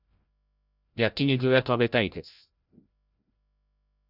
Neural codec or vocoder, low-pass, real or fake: codec, 16 kHz, 0.5 kbps, FreqCodec, larger model; 5.4 kHz; fake